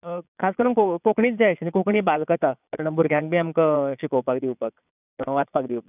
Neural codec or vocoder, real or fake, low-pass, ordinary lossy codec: vocoder, 22.05 kHz, 80 mel bands, Vocos; fake; 3.6 kHz; none